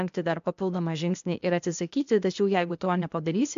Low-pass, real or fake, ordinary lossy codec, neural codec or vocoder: 7.2 kHz; fake; MP3, 64 kbps; codec, 16 kHz, 0.8 kbps, ZipCodec